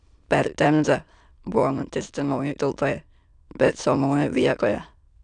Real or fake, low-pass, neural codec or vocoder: fake; 9.9 kHz; autoencoder, 22.05 kHz, a latent of 192 numbers a frame, VITS, trained on many speakers